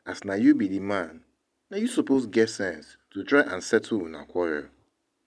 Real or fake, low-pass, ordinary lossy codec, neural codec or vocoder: real; none; none; none